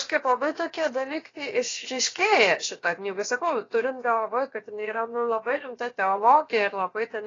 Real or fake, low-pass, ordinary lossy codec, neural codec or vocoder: fake; 7.2 kHz; AAC, 32 kbps; codec, 16 kHz, 0.7 kbps, FocalCodec